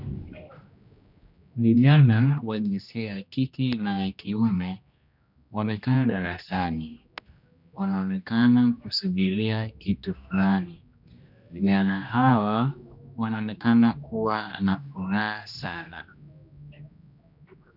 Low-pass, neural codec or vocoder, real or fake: 5.4 kHz; codec, 16 kHz, 1 kbps, X-Codec, HuBERT features, trained on general audio; fake